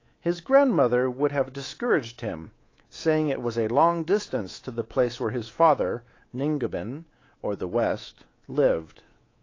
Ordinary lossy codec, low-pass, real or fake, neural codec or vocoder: AAC, 32 kbps; 7.2 kHz; fake; codec, 24 kHz, 3.1 kbps, DualCodec